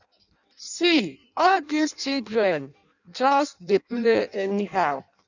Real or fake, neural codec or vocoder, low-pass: fake; codec, 16 kHz in and 24 kHz out, 0.6 kbps, FireRedTTS-2 codec; 7.2 kHz